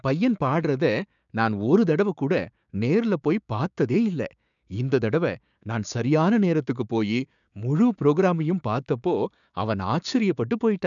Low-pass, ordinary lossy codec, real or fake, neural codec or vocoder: 7.2 kHz; none; fake; codec, 16 kHz, 6 kbps, DAC